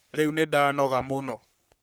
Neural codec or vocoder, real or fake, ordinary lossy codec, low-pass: codec, 44.1 kHz, 3.4 kbps, Pupu-Codec; fake; none; none